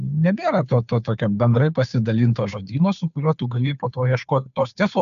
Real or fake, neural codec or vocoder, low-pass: fake; codec, 16 kHz, 2 kbps, FunCodec, trained on Chinese and English, 25 frames a second; 7.2 kHz